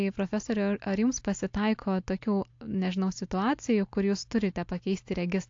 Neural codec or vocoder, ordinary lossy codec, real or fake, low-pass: none; AAC, 48 kbps; real; 7.2 kHz